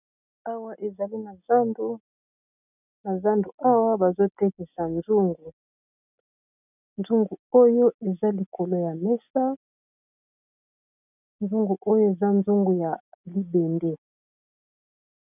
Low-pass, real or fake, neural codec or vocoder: 3.6 kHz; real; none